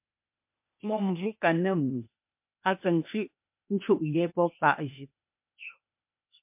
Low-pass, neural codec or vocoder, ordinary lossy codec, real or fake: 3.6 kHz; codec, 16 kHz, 0.8 kbps, ZipCodec; MP3, 32 kbps; fake